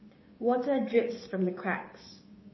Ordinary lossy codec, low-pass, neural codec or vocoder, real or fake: MP3, 24 kbps; 7.2 kHz; codec, 16 kHz, 6 kbps, DAC; fake